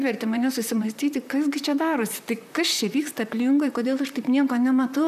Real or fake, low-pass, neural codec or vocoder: fake; 14.4 kHz; vocoder, 44.1 kHz, 128 mel bands, Pupu-Vocoder